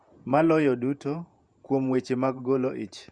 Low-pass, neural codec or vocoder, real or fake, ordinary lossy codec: 9.9 kHz; vocoder, 48 kHz, 128 mel bands, Vocos; fake; Opus, 64 kbps